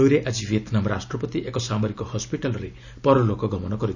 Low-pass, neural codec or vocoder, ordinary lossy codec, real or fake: 7.2 kHz; none; none; real